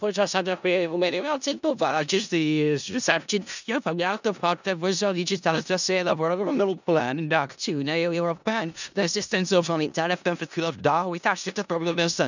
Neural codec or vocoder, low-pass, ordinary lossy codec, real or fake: codec, 16 kHz in and 24 kHz out, 0.4 kbps, LongCat-Audio-Codec, four codebook decoder; 7.2 kHz; none; fake